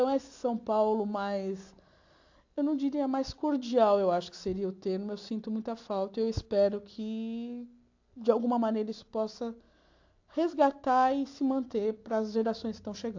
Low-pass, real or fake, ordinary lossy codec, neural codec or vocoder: 7.2 kHz; real; AAC, 48 kbps; none